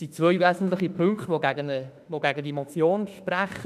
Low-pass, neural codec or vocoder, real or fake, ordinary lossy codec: 14.4 kHz; autoencoder, 48 kHz, 32 numbers a frame, DAC-VAE, trained on Japanese speech; fake; none